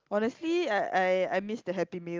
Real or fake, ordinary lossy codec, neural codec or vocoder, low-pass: real; Opus, 32 kbps; none; 7.2 kHz